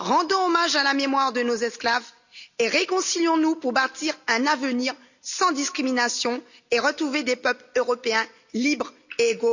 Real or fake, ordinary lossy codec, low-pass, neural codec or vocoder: real; none; 7.2 kHz; none